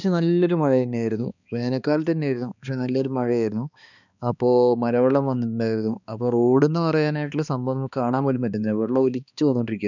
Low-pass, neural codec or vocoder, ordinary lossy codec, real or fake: 7.2 kHz; codec, 16 kHz, 2 kbps, X-Codec, HuBERT features, trained on balanced general audio; none; fake